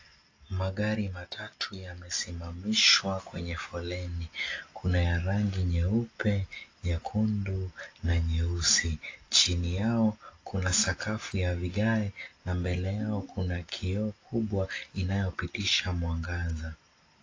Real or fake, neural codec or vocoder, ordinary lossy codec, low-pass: real; none; AAC, 32 kbps; 7.2 kHz